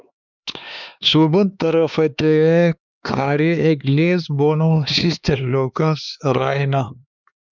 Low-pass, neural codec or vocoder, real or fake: 7.2 kHz; codec, 16 kHz, 2 kbps, X-Codec, HuBERT features, trained on LibriSpeech; fake